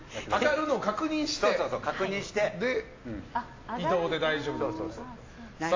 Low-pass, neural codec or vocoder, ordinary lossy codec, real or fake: 7.2 kHz; none; AAC, 32 kbps; real